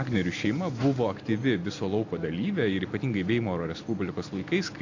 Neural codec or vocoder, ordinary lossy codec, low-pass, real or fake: none; AAC, 48 kbps; 7.2 kHz; real